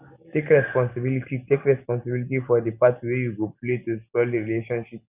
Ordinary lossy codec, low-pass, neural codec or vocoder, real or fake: none; 3.6 kHz; none; real